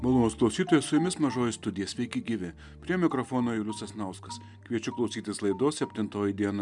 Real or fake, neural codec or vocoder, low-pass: real; none; 10.8 kHz